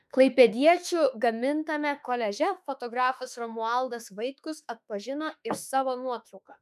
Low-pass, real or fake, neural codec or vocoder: 14.4 kHz; fake; autoencoder, 48 kHz, 32 numbers a frame, DAC-VAE, trained on Japanese speech